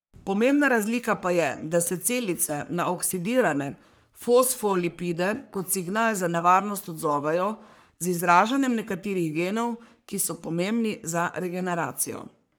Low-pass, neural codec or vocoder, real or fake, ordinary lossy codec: none; codec, 44.1 kHz, 3.4 kbps, Pupu-Codec; fake; none